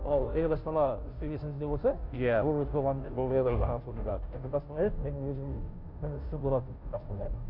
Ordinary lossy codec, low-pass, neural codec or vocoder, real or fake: MP3, 48 kbps; 5.4 kHz; codec, 16 kHz, 0.5 kbps, FunCodec, trained on Chinese and English, 25 frames a second; fake